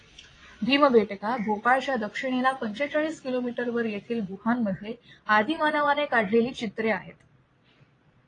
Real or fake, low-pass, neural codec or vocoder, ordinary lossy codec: fake; 9.9 kHz; vocoder, 22.05 kHz, 80 mel bands, Vocos; AAC, 32 kbps